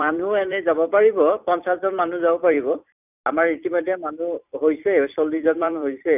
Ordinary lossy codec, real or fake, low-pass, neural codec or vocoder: none; fake; 3.6 kHz; vocoder, 44.1 kHz, 128 mel bands every 256 samples, BigVGAN v2